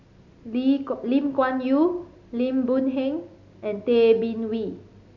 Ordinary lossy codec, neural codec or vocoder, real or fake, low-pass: MP3, 64 kbps; none; real; 7.2 kHz